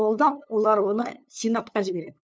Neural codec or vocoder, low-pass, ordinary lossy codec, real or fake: codec, 16 kHz, 2 kbps, FunCodec, trained on LibriTTS, 25 frames a second; none; none; fake